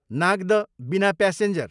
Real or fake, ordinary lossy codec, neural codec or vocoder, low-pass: fake; none; vocoder, 44.1 kHz, 128 mel bands every 512 samples, BigVGAN v2; 10.8 kHz